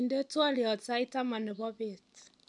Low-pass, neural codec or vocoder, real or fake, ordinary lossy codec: 10.8 kHz; none; real; none